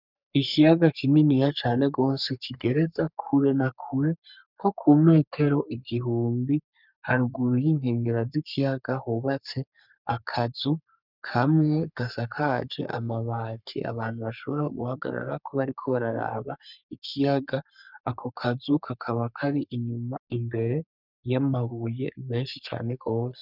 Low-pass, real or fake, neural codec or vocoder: 5.4 kHz; fake; codec, 44.1 kHz, 3.4 kbps, Pupu-Codec